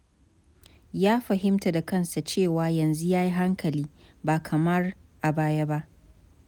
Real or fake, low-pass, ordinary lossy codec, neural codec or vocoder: real; none; none; none